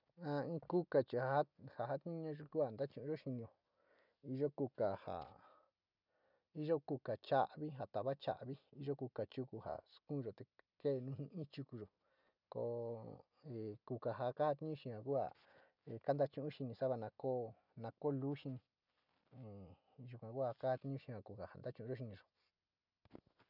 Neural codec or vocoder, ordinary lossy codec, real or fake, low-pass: none; none; real; 5.4 kHz